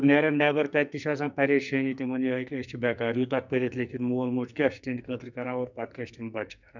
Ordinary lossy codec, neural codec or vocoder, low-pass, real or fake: none; codec, 44.1 kHz, 2.6 kbps, SNAC; 7.2 kHz; fake